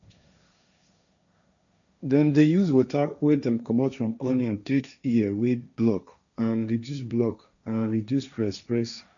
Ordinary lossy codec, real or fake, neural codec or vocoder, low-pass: none; fake; codec, 16 kHz, 1.1 kbps, Voila-Tokenizer; 7.2 kHz